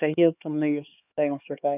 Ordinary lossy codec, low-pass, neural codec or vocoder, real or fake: none; 3.6 kHz; codec, 16 kHz, 2 kbps, X-Codec, HuBERT features, trained on LibriSpeech; fake